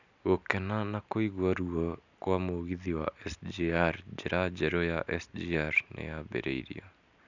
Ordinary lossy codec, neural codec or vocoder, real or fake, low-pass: none; none; real; 7.2 kHz